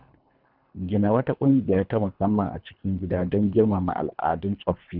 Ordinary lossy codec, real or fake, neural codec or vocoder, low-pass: none; fake; codec, 24 kHz, 3 kbps, HILCodec; 5.4 kHz